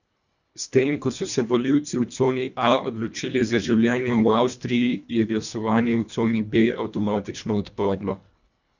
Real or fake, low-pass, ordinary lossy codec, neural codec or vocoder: fake; 7.2 kHz; none; codec, 24 kHz, 1.5 kbps, HILCodec